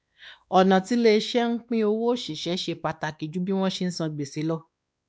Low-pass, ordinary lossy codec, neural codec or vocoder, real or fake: none; none; codec, 16 kHz, 2 kbps, X-Codec, WavLM features, trained on Multilingual LibriSpeech; fake